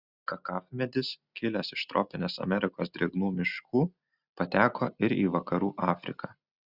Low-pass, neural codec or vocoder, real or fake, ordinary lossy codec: 5.4 kHz; none; real; Opus, 64 kbps